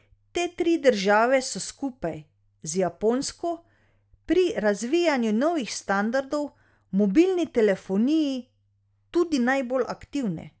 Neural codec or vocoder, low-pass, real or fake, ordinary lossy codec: none; none; real; none